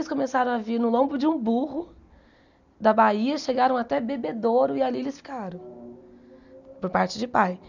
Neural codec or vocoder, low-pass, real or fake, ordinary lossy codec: none; 7.2 kHz; real; none